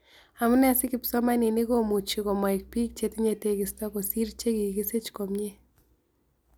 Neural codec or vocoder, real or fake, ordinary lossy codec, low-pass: none; real; none; none